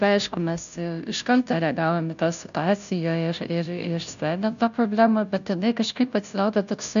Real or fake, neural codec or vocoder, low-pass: fake; codec, 16 kHz, 0.5 kbps, FunCodec, trained on Chinese and English, 25 frames a second; 7.2 kHz